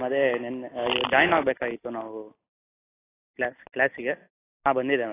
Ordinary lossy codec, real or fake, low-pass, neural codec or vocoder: AAC, 16 kbps; real; 3.6 kHz; none